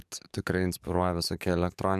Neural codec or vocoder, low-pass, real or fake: codec, 44.1 kHz, 7.8 kbps, DAC; 14.4 kHz; fake